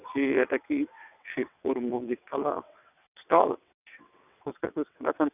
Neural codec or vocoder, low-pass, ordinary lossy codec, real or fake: vocoder, 22.05 kHz, 80 mel bands, WaveNeXt; 3.6 kHz; none; fake